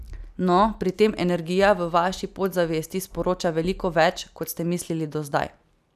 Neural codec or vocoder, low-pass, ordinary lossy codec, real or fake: none; 14.4 kHz; none; real